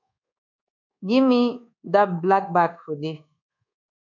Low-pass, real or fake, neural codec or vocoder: 7.2 kHz; fake; codec, 24 kHz, 1.2 kbps, DualCodec